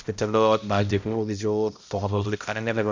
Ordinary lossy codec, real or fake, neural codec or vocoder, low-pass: MP3, 64 kbps; fake; codec, 16 kHz, 0.5 kbps, X-Codec, HuBERT features, trained on balanced general audio; 7.2 kHz